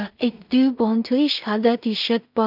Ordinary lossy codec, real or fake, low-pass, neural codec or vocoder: none; fake; 5.4 kHz; codec, 16 kHz in and 24 kHz out, 0.4 kbps, LongCat-Audio-Codec, two codebook decoder